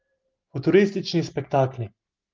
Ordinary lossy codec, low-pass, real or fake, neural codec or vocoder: Opus, 32 kbps; 7.2 kHz; real; none